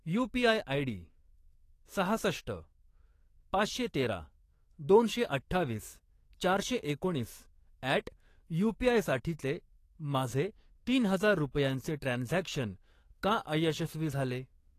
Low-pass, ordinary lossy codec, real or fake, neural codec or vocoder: 14.4 kHz; AAC, 48 kbps; fake; codec, 44.1 kHz, 7.8 kbps, DAC